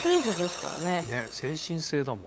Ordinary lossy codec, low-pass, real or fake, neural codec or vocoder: none; none; fake; codec, 16 kHz, 8 kbps, FunCodec, trained on LibriTTS, 25 frames a second